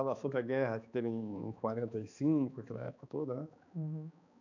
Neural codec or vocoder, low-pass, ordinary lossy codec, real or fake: codec, 16 kHz, 2 kbps, X-Codec, HuBERT features, trained on balanced general audio; 7.2 kHz; none; fake